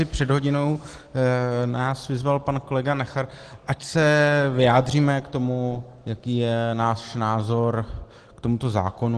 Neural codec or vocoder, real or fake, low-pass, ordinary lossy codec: none; real; 9.9 kHz; Opus, 16 kbps